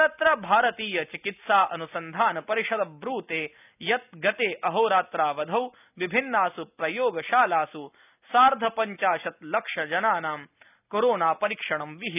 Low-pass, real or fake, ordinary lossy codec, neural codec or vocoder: 3.6 kHz; real; none; none